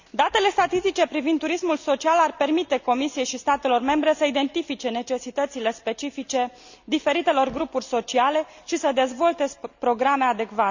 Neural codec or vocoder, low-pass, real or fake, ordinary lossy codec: none; 7.2 kHz; real; none